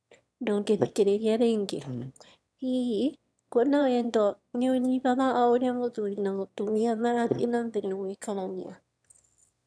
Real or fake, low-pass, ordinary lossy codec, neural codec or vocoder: fake; none; none; autoencoder, 22.05 kHz, a latent of 192 numbers a frame, VITS, trained on one speaker